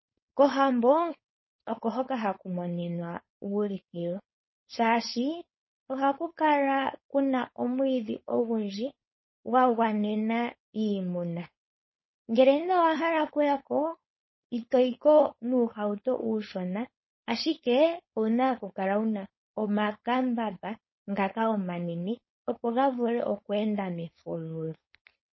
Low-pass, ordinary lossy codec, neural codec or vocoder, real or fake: 7.2 kHz; MP3, 24 kbps; codec, 16 kHz, 4.8 kbps, FACodec; fake